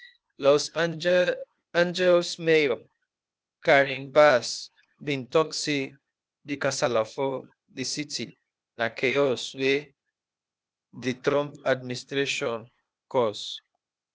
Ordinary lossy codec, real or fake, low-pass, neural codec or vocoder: none; fake; none; codec, 16 kHz, 0.8 kbps, ZipCodec